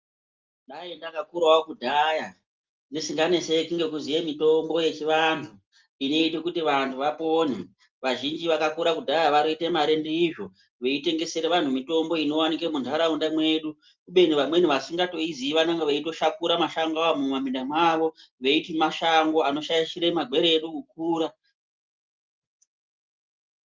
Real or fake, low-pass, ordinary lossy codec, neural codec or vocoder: real; 7.2 kHz; Opus, 32 kbps; none